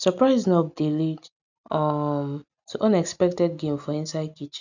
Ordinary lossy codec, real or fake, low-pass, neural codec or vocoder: none; real; 7.2 kHz; none